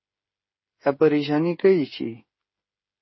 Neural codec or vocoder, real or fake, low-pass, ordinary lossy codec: codec, 16 kHz, 8 kbps, FreqCodec, smaller model; fake; 7.2 kHz; MP3, 24 kbps